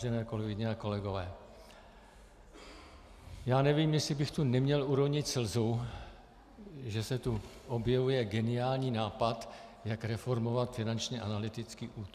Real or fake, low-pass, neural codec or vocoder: real; 14.4 kHz; none